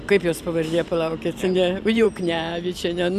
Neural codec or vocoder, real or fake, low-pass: vocoder, 44.1 kHz, 128 mel bands every 512 samples, BigVGAN v2; fake; 14.4 kHz